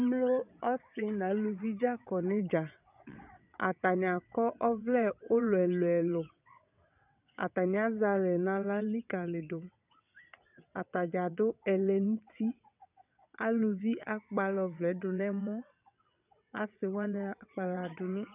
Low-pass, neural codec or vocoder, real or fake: 3.6 kHz; vocoder, 22.05 kHz, 80 mel bands, WaveNeXt; fake